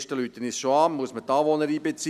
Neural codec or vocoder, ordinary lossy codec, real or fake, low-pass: none; none; real; 14.4 kHz